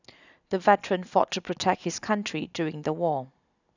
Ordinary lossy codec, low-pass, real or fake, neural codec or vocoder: none; 7.2 kHz; real; none